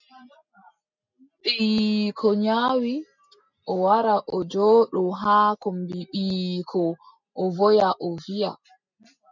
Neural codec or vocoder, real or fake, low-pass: none; real; 7.2 kHz